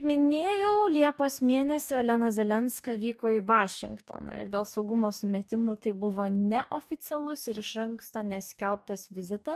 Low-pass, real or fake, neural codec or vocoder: 14.4 kHz; fake; codec, 44.1 kHz, 2.6 kbps, DAC